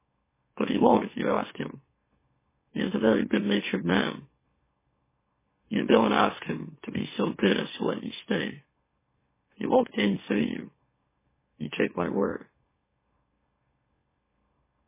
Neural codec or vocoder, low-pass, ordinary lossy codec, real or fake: autoencoder, 44.1 kHz, a latent of 192 numbers a frame, MeloTTS; 3.6 kHz; MP3, 16 kbps; fake